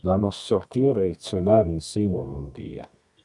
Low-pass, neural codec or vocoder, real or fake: 10.8 kHz; codec, 24 kHz, 0.9 kbps, WavTokenizer, medium music audio release; fake